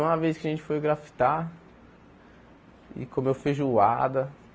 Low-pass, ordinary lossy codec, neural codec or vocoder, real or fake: none; none; none; real